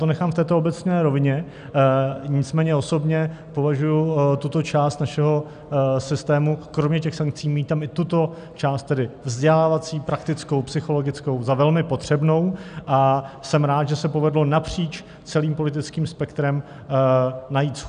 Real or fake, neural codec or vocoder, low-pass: real; none; 9.9 kHz